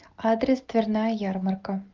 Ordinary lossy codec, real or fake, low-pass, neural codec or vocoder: Opus, 24 kbps; real; 7.2 kHz; none